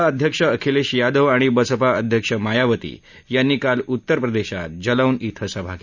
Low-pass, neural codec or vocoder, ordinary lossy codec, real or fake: 7.2 kHz; none; Opus, 64 kbps; real